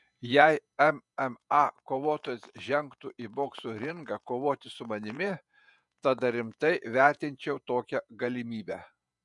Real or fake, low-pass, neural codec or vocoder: fake; 10.8 kHz; vocoder, 48 kHz, 128 mel bands, Vocos